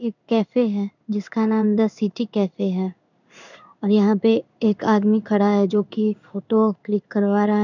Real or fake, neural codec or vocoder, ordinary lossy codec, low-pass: fake; codec, 16 kHz in and 24 kHz out, 1 kbps, XY-Tokenizer; none; 7.2 kHz